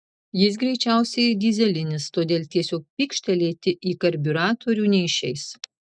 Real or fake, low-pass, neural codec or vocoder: real; 9.9 kHz; none